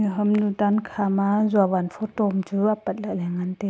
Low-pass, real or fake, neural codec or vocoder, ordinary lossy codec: none; real; none; none